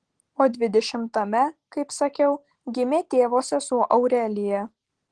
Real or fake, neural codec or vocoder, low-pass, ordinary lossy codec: real; none; 9.9 kHz; Opus, 16 kbps